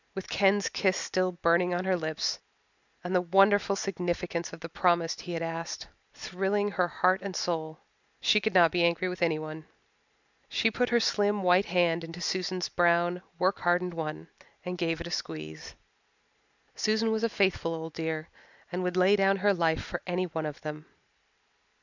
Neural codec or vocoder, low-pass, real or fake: none; 7.2 kHz; real